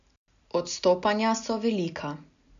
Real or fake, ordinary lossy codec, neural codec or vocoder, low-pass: real; none; none; 7.2 kHz